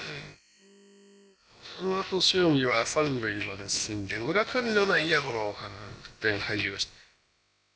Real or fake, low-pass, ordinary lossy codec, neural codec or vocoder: fake; none; none; codec, 16 kHz, about 1 kbps, DyCAST, with the encoder's durations